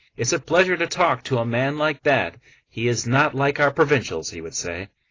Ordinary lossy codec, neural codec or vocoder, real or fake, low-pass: AAC, 32 kbps; none; real; 7.2 kHz